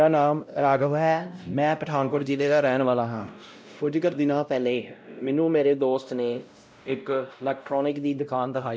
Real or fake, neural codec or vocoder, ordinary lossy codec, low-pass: fake; codec, 16 kHz, 0.5 kbps, X-Codec, WavLM features, trained on Multilingual LibriSpeech; none; none